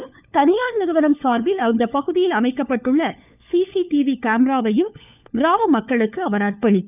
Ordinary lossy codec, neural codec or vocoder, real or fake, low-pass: none; codec, 16 kHz, 4 kbps, FunCodec, trained on LibriTTS, 50 frames a second; fake; 3.6 kHz